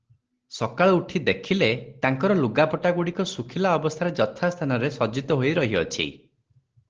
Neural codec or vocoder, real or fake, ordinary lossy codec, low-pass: none; real; Opus, 16 kbps; 7.2 kHz